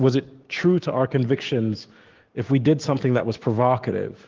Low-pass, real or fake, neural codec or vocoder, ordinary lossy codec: 7.2 kHz; fake; codec, 16 kHz, 6 kbps, DAC; Opus, 16 kbps